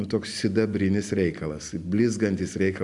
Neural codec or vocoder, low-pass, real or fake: none; 10.8 kHz; real